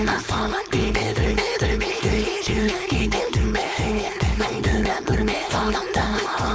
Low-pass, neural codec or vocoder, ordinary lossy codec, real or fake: none; codec, 16 kHz, 4.8 kbps, FACodec; none; fake